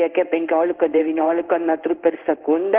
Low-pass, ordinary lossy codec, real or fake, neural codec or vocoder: 3.6 kHz; Opus, 16 kbps; fake; codec, 16 kHz in and 24 kHz out, 1 kbps, XY-Tokenizer